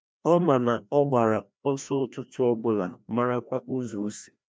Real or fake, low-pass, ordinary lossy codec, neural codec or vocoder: fake; none; none; codec, 16 kHz, 1 kbps, FreqCodec, larger model